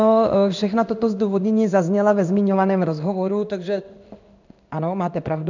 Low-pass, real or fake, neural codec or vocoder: 7.2 kHz; fake; codec, 16 kHz in and 24 kHz out, 1 kbps, XY-Tokenizer